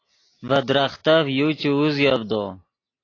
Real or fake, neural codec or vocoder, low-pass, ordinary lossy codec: real; none; 7.2 kHz; AAC, 32 kbps